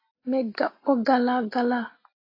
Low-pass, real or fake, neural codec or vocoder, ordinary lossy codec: 5.4 kHz; real; none; AAC, 24 kbps